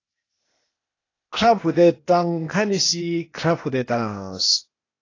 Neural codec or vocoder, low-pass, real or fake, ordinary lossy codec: codec, 16 kHz, 0.8 kbps, ZipCodec; 7.2 kHz; fake; AAC, 32 kbps